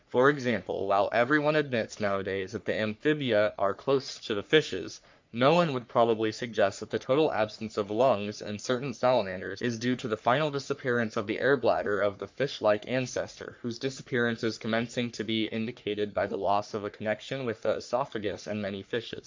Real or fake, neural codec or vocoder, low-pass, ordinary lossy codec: fake; codec, 44.1 kHz, 3.4 kbps, Pupu-Codec; 7.2 kHz; MP3, 64 kbps